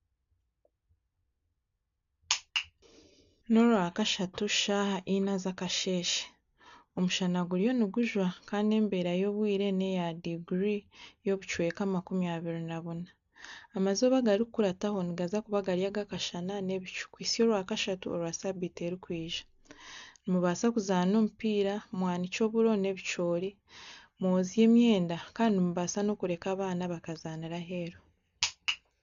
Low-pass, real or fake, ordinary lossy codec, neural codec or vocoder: 7.2 kHz; real; none; none